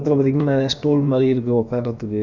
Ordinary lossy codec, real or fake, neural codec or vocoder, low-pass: none; fake; codec, 16 kHz, about 1 kbps, DyCAST, with the encoder's durations; 7.2 kHz